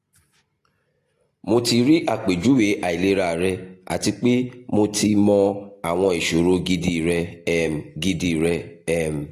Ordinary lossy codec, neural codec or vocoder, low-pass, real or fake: AAC, 48 kbps; none; 14.4 kHz; real